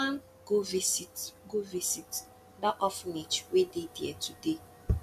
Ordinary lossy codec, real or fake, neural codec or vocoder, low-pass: none; real; none; 14.4 kHz